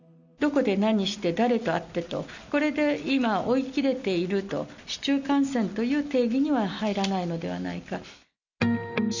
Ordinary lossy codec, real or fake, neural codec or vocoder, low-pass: none; real; none; 7.2 kHz